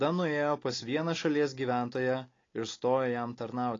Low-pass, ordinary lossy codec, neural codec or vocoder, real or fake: 7.2 kHz; AAC, 32 kbps; none; real